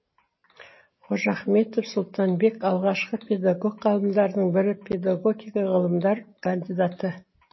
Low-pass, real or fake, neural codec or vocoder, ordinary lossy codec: 7.2 kHz; real; none; MP3, 24 kbps